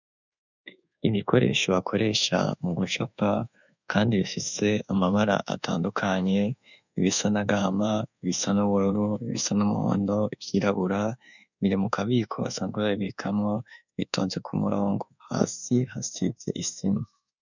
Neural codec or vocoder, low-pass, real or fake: codec, 24 kHz, 1.2 kbps, DualCodec; 7.2 kHz; fake